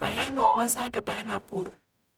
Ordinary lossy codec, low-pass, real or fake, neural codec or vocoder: none; none; fake; codec, 44.1 kHz, 0.9 kbps, DAC